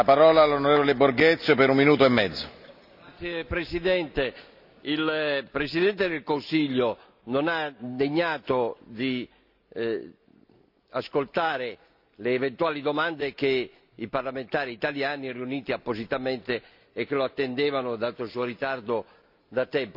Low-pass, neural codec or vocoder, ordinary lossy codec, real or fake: 5.4 kHz; none; none; real